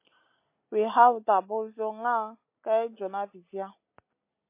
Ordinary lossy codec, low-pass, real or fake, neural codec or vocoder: MP3, 24 kbps; 3.6 kHz; real; none